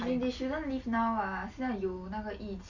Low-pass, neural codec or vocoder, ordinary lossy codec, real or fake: 7.2 kHz; none; none; real